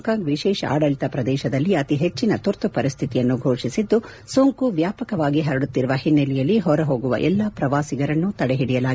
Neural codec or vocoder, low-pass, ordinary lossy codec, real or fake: none; none; none; real